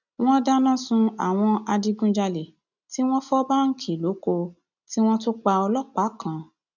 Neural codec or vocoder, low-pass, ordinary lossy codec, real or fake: none; 7.2 kHz; none; real